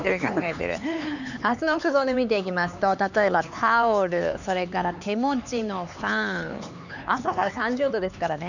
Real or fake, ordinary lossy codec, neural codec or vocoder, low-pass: fake; none; codec, 16 kHz, 4 kbps, X-Codec, HuBERT features, trained on LibriSpeech; 7.2 kHz